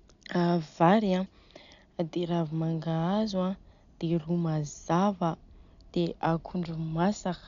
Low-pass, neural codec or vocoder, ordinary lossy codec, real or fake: 7.2 kHz; none; none; real